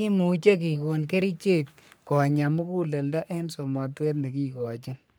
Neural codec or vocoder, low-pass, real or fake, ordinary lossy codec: codec, 44.1 kHz, 3.4 kbps, Pupu-Codec; none; fake; none